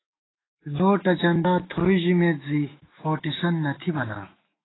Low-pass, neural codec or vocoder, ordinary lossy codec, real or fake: 7.2 kHz; autoencoder, 48 kHz, 128 numbers a frame, DAC-VAE, trained on Japanese speech; AAC, 16 kbps; fake